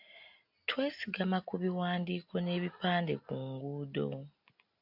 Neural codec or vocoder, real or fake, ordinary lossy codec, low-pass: none; real; AAC, 48 kbps; 5.4 kHz